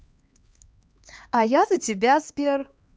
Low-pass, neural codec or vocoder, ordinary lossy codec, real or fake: none; codec, 16 kHz, 2 kbps, X-Codec, HuBERT features, trained on LibriSpeech; none; fake